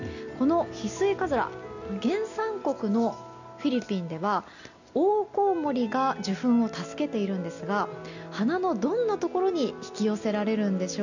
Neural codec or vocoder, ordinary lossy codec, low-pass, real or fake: none; none; 7.2 kHz; real